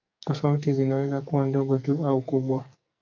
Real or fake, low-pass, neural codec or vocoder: fake; 7.2 kHz; codec, 44.1 kHz, 2.6 kbps, SNAC